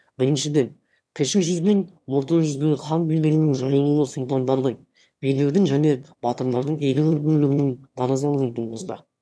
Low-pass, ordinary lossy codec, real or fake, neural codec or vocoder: none; none; fake; autoencoder, 22.05 kHz, a latent of 192 numbers a frame, VITS, trained on one speaker